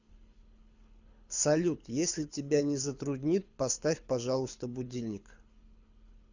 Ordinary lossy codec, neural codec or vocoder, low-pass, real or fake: Opus, 64 kbps; codec, 24 kHz, 6 kbps, HILCodec; 7.2 kHz; fake